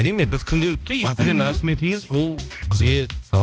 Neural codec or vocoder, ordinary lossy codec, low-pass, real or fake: codec, 16 kHz, 1 kbps, X-Codec, HuBERT features, trained on balanced general audio; none; none; fake